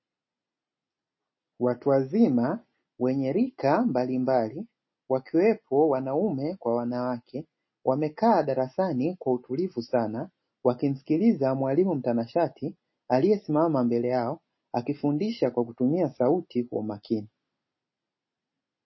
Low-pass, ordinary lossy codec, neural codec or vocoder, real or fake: 7.2 kHz; MP3, 24 kbps; none; real